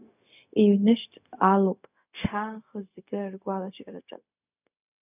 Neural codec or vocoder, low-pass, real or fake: codec, 16 kHz, 0.4 kbps, LongCat-Audio-Codec; 3.6 kHz; fake